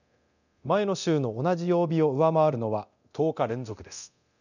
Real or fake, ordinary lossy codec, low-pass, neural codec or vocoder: fake; none; 7.2 kHz; codec, 24 kHz, 0.9 kbps, DualCodec